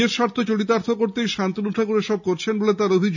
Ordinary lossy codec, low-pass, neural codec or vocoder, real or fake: none; 7.2 kHz; none; real